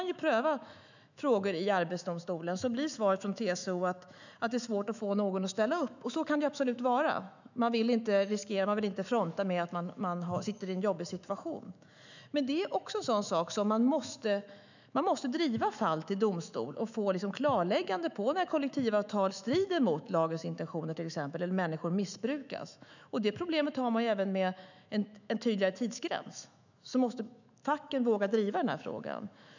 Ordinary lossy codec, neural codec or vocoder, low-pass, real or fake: none; autoencoder, 48 kHz, 128 numbers a frame, DAC-VAE, trained on Japanese speech; 7.2 kHz; fake